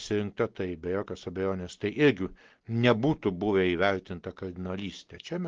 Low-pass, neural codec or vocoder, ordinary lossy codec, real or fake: 7.2 kHz; none; Opus, 16 kbps; real